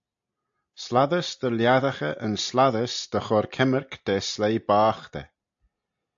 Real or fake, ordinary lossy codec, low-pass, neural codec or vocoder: real; AAC, 64 kbps; 7.2 kHz; none